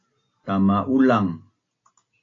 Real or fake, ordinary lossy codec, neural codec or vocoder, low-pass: real; AAC, 32 kbps; none; 7.2 kHz